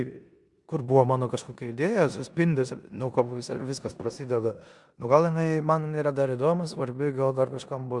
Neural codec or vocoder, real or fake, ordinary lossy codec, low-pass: codec, 16 kHz in and 24 kHz out, 0.9 kbps, LongCat-Audio-Codec, four codebook decoder; fake; Opus, 64 kbps; 10.8 kHz